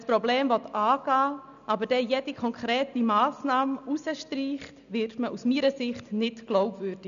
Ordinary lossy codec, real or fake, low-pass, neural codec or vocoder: none; real; 7.2 kHz; none